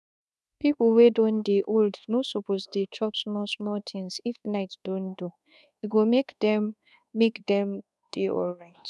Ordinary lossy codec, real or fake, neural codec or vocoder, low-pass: none; fake; codec, 24 kHz, 1.2 kbps, DualCodec; none